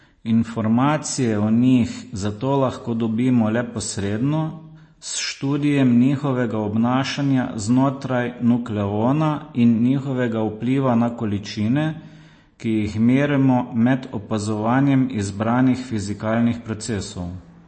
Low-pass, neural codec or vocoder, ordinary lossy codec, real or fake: 9.9 kHz; none; MP3, 32 kbps; real